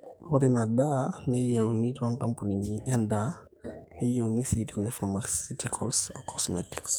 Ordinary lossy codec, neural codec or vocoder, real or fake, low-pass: none; codec, 44.1 kHz, 2.6 kbps, SNAC; fake; none